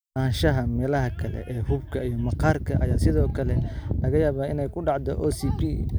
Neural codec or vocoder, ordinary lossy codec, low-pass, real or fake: none; none; none; real